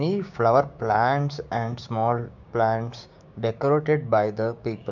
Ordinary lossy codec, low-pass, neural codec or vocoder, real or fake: none; 7.2 kHz; codec, 16 kHz, 6 kbps, DAC; fake